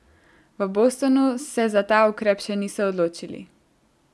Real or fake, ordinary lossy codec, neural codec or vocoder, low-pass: real; none; none; none